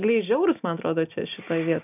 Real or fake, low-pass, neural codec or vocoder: real; 3.6 kHz; none